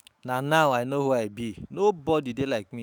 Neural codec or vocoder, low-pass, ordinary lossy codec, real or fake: autoencoder, 48 kHz, 128 numbers a frame, DAC-VAE, trained on Japanese speech; none; none; fake